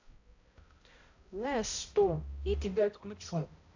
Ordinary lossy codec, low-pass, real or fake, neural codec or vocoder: AAC, 48 kbps; 7.2 kHz; fake; codec, 16 kHz, 0.5 kbps, X-Codec, HuBERT features, trained on balanced general audio